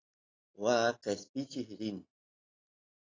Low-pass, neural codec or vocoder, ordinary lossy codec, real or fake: 7.2 kHz; none; AAC, 32 kbps; real